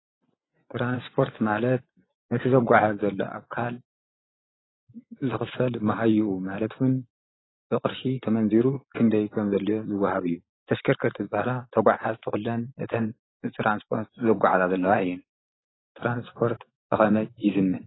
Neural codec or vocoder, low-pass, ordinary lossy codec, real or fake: vocoder, 24 kHz, 100 mel bands, Vocos; 7.2 kHz; AAC, 16 kbps; fake